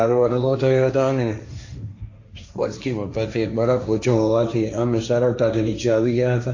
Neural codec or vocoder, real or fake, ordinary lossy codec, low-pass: codec, 16 kHz, 1.1 kbps, Voila-Tokenizer; fake; AAC, 48 kbps; 7.2 kHz